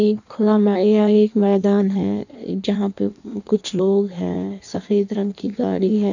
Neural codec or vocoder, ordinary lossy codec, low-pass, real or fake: codec, 16 kHz in and 24 kHz out, 1.1 kbps, FireRedTTS-2 codec; none; 7.2 kHz; fake